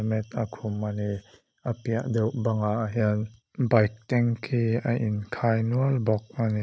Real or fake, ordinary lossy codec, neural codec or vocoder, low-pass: real; none; none; none